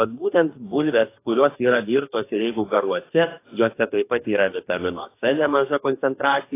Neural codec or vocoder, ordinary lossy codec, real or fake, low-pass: codec, 44.1 kHz, 2.6 kbps, DAC; AAC, 24 kbps; fake; 3.6 kHz